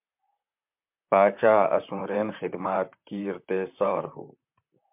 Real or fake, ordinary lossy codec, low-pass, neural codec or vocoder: fake; MP3, 32 kbps; 3.6 kHz; vocoder, 44.1 kHz, 128 mel bands, Pupu-Vocoder